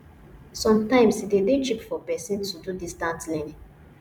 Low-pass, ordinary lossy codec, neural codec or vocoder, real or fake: 19.8 kHz; none; vocoder, 44.1 kHz, 128 mel bands every 256 samples, BigVGAN v2; fake